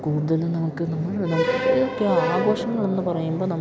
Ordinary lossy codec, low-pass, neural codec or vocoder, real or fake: none; none; none; real